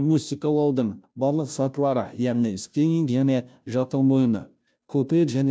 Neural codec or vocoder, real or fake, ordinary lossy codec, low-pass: codec, 16 kHz, 0.5 kbps, FunCodec, trained on Chinese and English, 25 frames a second; fake; none; none